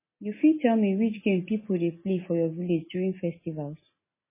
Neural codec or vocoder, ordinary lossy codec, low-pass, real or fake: none; MP3, 16 kbps; 3.6 kHz; real